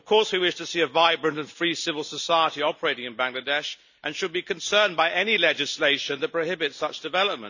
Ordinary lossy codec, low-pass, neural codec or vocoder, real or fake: none; 7.2 kHz; none; real